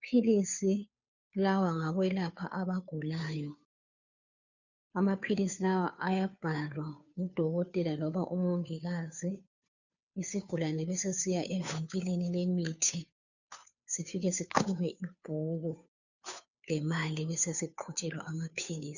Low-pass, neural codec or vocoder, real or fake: 7.2 kHz; codec, 16 kHz, 2 kbps, FunCodec, trained on Chinese and English, 25 frames a second; fake